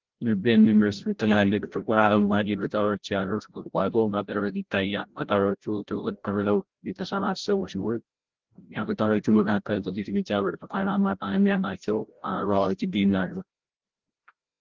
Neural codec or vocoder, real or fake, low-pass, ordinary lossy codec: codec, 16 kHz, 0.5 kbps, FreqCodec, larger model; fake; 7.2 kHz; Opus, 16 kbps